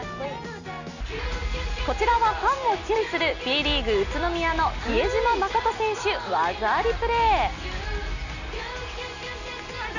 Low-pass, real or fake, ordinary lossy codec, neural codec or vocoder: 7.2 kHz; real; none; none